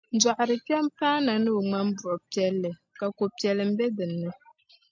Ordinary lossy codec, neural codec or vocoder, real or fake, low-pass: MP3, 64 kbps; none; real; 7.2 kHz